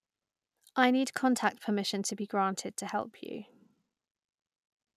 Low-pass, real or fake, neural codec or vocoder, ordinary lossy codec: 14.4 kHz; real; none; none